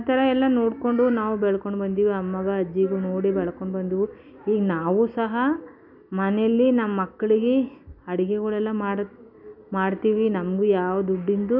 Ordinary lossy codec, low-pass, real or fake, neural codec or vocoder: none; 5.4 kHz; real; none